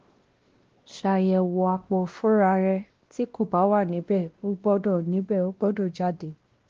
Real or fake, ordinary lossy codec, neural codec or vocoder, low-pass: fake; Opus, 16 kbps; codec, 16 kHz, 1 kbps, X-Codec, WavLM features, trained on Multilingual LibriSpeech; 7.2 kHz